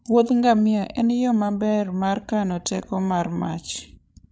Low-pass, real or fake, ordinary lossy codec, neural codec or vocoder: none; fake; none; codec, 16 kHz, 16 kbps, FreqCodec, larger model